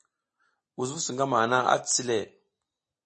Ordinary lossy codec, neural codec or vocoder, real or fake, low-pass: MP3, 32 kbps; none; real; 9.9 kHz